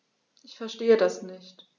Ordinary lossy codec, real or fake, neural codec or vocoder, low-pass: none; real; none; 7.2 kHz